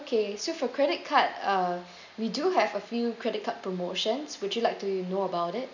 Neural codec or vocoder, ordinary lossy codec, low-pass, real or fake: none; none; 7.2 kHz; real